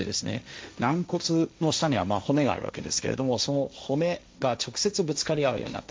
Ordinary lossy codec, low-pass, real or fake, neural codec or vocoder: none; none; fake; codec, 16 kHz, 1.1 kbps, Voila-Tokenizer